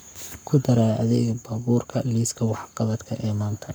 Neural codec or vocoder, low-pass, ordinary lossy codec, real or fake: codec, 44.1 kHz, 7.8 kbps, Pupu-Codec; none; none; fake